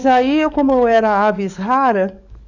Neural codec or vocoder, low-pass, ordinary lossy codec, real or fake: codec, 44.1 kHz, 7.8 kbps, DAC; 7.2 kHz; none; fake